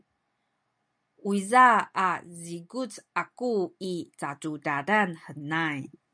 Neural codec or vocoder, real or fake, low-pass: none; real; 9.9 kHz